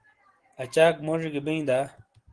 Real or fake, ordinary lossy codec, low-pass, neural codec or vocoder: real; Opus, 16 kbps; 9.9 kHz; none